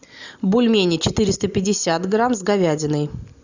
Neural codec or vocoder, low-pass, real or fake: none; 7.2 kHz; real